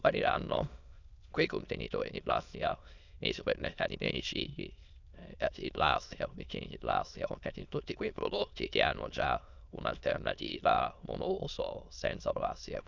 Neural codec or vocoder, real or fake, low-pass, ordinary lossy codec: autoencoder, 22.05 kHz, a latent of 192 numbers a frame, VITS, trained on many speakers; fake; 7.2 kHz; none